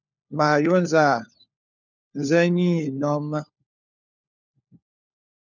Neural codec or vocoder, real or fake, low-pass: codec, 16 kHz, 4 kbps, FunCodec, trained on LibriTTS, 50 frames a second; fake; 7.2 kHz